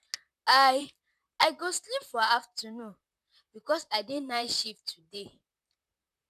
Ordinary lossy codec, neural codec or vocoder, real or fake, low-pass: none; vocoder, 44.1 kHz, 128 mel bands, Pupu-Vocoder; fake; 14.4 kHz